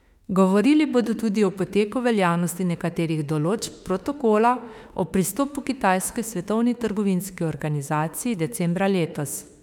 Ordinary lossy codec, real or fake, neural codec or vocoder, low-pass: none; fake; autoencoder, 48 kHz, 32 numbers a frame, DAC-VAE, trained on Japanese speech; 19.8 kHz